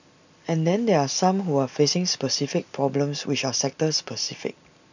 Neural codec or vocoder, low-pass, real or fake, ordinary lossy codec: none; 7.2 kHz; real; none